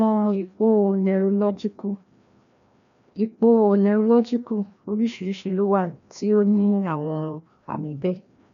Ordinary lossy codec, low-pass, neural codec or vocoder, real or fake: none; 7.2 kHz; codec, 16 kHz, 1 kbps, FreqCodec, larger model; fake